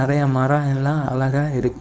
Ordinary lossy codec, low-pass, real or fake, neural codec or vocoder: none; none; fake; codec, 16 kHz, 4.8 kbps, FACodec